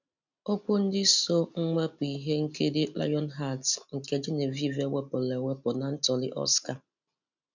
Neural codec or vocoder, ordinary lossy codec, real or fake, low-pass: none; none; real; 7.2 kHz